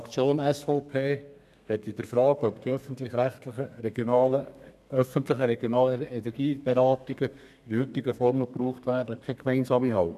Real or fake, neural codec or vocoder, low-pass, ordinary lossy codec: fake; codec, 32 kHz, 1.9 kbps, SNAC; 14.4 kHz; none